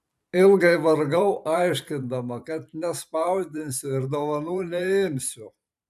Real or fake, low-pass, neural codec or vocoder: fake; 14.4 kHz; vocoder, 44.1 kHz, 128 mel bands every 512 samples, BigVGAN v2